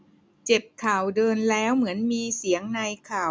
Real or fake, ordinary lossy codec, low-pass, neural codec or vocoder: real; none; none; none